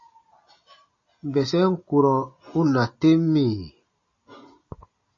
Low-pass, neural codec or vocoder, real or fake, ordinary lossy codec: 7.2 kHz; none; real; MP3, 32 kbps